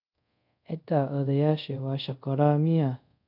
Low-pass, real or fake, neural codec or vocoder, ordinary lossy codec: 5.4 kHz; fake; codec, 24 kHz, 0.5 kbps, DualCodec; none